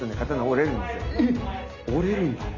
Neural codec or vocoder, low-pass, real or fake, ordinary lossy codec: none; 7.2 kHz; real; none